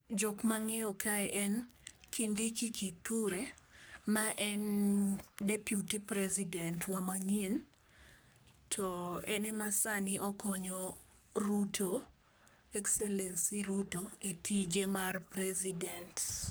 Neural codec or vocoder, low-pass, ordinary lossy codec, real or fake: codec, 44.1 kHz, 3.4 kbps, Pupu-Codec; none; none; fake